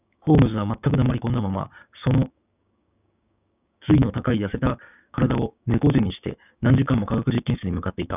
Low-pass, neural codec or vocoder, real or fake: 3.6 kHz; codec, 16 kHz, 6 kbps, DAC; fake